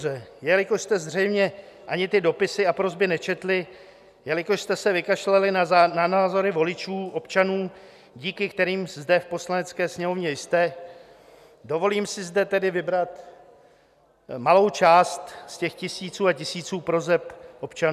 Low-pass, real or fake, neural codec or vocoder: 14.4 kHz; real; none